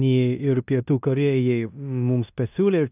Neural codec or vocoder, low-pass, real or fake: codec, 16 kHz in and 24 kHz out, 0.9 kbps, LongCat-Audio-Codec, four codebook decoder; 3.6 kHz; fake